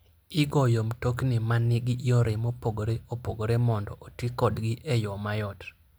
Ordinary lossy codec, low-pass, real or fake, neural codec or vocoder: none; none; real; none